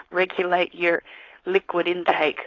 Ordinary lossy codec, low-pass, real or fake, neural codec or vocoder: AAC, 32 kbps; 7.2 kHz; fake; codec, 16 kHz, 4.8 kbps, FACodec